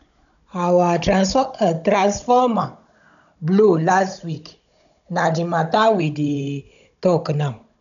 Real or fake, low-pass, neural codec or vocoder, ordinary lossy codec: fake; 7.2 kHz; codec, 16 kHz, 16 kbps, FunCodec, trained on Chinese and English, 50 frames a second; none